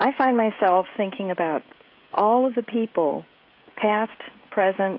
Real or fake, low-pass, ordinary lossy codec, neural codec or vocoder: fake; 5.4 kHz; AAC, 32 kbps; codec, 16 kHz, 16 kbps, FreqCodec, smaller model